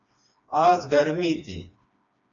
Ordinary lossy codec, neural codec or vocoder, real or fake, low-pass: AAC, 64 kbps; codec, 16 kHz, 2 kbps, FreqCodec, smaller model; fake; 7.2 kHz